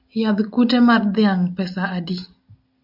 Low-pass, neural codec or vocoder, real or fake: 5.4 kHz; none; real